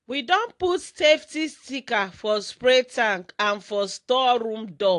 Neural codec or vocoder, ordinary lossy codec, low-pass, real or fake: none; AAC, 48 kbps; 10.8 kHz; real